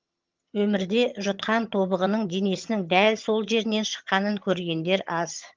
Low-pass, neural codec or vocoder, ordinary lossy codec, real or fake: 7.2 kHz; vocoder, 22.05 kHz, 80 mel bands, HiFi-GAN; Opus, 32 kbps; fake